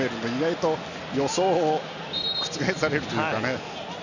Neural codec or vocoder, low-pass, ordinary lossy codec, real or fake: none; 7.2 kHz; none; real